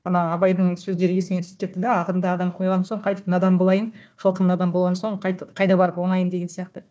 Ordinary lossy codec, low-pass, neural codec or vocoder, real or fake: none; none; codec, 16 kHz, 1 kbps, FunCodec, trained on Chinese and English, 50 frames a second; fake